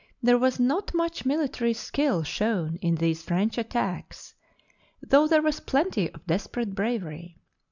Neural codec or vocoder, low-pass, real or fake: none; 7.2 kHz; real